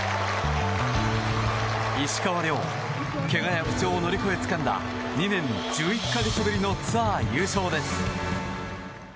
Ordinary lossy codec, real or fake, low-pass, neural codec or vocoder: none; real; none; none